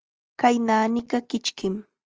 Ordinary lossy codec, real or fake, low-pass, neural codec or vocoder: Opus, 24 kbps; real; 7.2 kHz; none